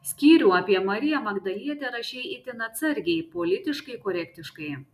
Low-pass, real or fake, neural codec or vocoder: 14.4 kHz; real; none